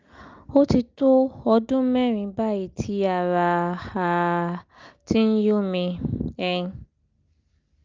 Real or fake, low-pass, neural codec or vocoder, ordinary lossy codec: real; 7.2 kHz; none; Opus, 24 kbps